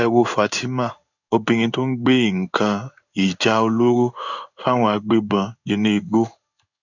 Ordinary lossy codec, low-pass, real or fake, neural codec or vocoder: none; 7.2 kHz; fake; codec, 16 kHz in and 24 kHz out, 1 kbps, XY-Tokenizer